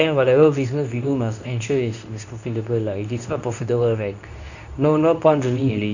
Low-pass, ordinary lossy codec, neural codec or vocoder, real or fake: 7.2 kHz; none; codec, 24 kHz, 0.9 kbps, WavTokenizer, medium speech release version 2; fake